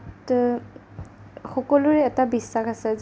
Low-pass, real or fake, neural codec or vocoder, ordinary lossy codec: none; real; none; none